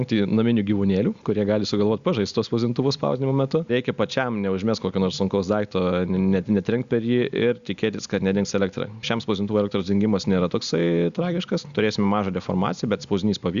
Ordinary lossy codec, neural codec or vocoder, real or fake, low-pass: Opus, 64 kbps; none; real; 7.2 kHz